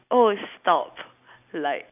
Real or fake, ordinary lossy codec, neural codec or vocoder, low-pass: real; none; none; 3.6 kHz